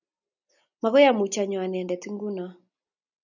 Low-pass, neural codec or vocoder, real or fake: 7.2 kHz; none; real